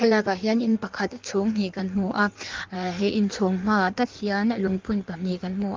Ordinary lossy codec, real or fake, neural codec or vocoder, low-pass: Opus, 32 kbps; fake; codec, 16 kHz in and 24 kHz out, 1.1 kbps, FireRedTTS-2 codec; 7.2 kHz